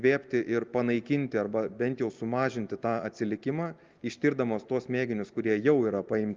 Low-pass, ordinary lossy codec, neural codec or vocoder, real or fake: 7.2 kHz; Opus, 32 kbps; none; real